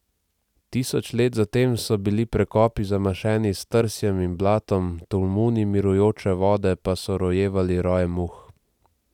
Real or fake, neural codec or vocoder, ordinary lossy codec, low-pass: real; none; none; 19.8 kHz